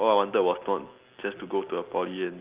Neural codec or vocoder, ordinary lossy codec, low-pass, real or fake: none; Opus, 32 kbps; 3.6 kHz; real